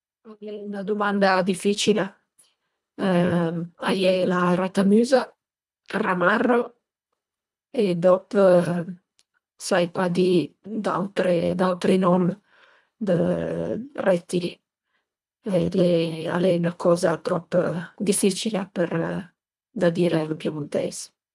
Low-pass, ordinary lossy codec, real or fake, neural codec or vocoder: none; none; fake; codec, 24 kHz, 1.5 kbps, HILCodec